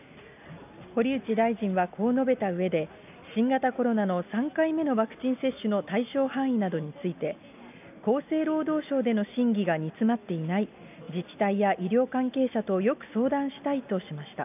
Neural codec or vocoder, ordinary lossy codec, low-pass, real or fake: none; none; 3.6 kHz; real